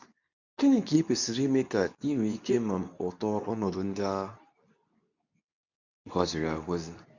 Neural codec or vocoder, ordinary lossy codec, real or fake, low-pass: codec, 24 kHz, 0.9 kbps, WavTokenizer, medium speech release version 2; none; fake; 7.2 kHz